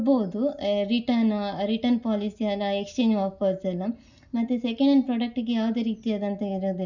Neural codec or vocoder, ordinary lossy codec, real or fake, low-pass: none; none; real; 7.2 kHz